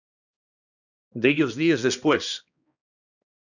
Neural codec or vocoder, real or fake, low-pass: codec, 16 kHz, 1 kbps, X-Codec, HuBERT features, trained on balanced general audio; fake; 7.2 kHz